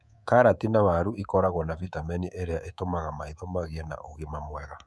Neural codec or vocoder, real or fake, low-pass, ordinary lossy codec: codec, 24 kHz, 3.1 kbps, DualCodec; fake; none; none